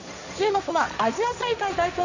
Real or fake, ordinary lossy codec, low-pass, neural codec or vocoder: fake; none; 7.2 kHz; codec, 16 kHz, 1.1 kbps, Voila-Tokenizer